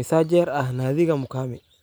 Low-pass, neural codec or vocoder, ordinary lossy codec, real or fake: none; none; none; real